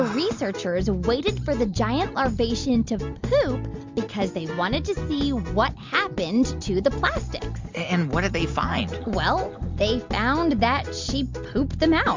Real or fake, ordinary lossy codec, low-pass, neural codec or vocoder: real; MP3, 64 kbps; 7.2 kHz; none